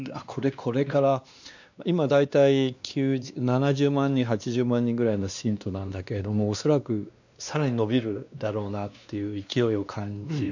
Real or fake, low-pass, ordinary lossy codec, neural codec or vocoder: fake; 7.2 kHz; none; codec, 16 kHz, 2 kbps, X-Codec, WavLM features, trained on Multilingual LibriSpeech